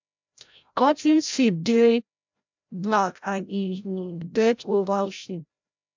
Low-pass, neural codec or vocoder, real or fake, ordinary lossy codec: 7.2 kHz; codec, 16 kHz, 0.5 kbps, FreqCodec, larger model; fake; MP3, 64 kbps